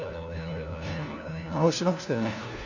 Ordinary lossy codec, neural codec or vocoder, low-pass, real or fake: none; codec, 16 kHz, 1 kbps, FunCodec, trained on LibriTTS, 50 frames a second; 7.2 kHz; fake